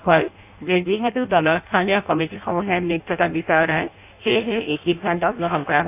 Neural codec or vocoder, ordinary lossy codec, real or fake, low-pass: codec, 16 kHz in and 24 kHz out, 0.6 kbps, FireRedTTS-2 codec; AAC, 32 kbps; fake; 3.6 kHz